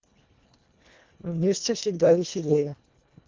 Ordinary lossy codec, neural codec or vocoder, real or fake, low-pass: Opus, 32 kbps; codec, 24 kHz, 1.5 kbps, HILCodec; fake; 7.2 kHz